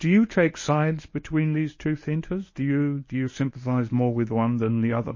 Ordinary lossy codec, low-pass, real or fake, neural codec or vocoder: MP3, 32 kbps; 7.2 kHz; fake; codec, 24 kHz, 0.9 kbps, WavTokenizer, medium speech release version 1